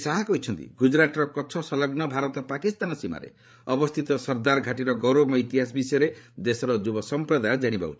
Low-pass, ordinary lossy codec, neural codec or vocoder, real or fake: none; none; codec, 16 kHz, 8 kbps, FreqCodec, larger model; fake